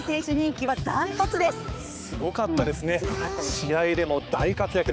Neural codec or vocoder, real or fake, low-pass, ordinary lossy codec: codec, 16 kHz, 4 kbps, X-Codec, HuBERT features, trained on balanced general audio; fake; none; none